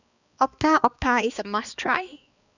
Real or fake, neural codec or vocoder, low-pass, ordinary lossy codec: fake; codec, 16 kHz, 2 kbps, X-Codec, HuBERT features, trained on balanced general audio; 7.2 kHz; none